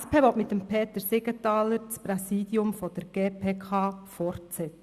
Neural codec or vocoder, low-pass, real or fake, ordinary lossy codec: none; 14.4 kHz; real; Opus, 64 kbps